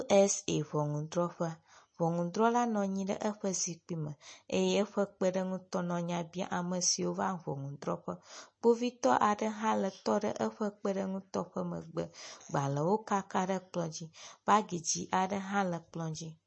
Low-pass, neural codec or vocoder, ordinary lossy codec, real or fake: 9.9 kHz; none; MP3, 32 kbps; real